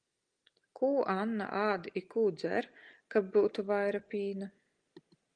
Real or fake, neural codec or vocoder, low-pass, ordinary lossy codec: real; none; 9.9 kHz; Opus, 32 kbps